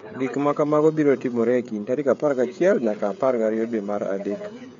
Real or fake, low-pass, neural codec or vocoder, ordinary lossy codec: fake; 7.2 kHz; codec, 16 kHz, 16 kbps, FreqCodec, smaller model; MP3, 48 kbps